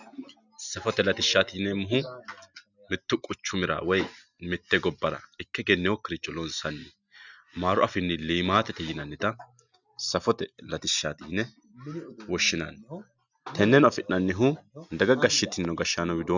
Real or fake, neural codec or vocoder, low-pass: real; none; 7.2 kHz